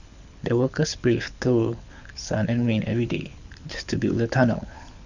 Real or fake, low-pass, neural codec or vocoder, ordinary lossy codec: fake; 7.2 kHz; codec, 24 kHz, 6 kbps, HILCodec; none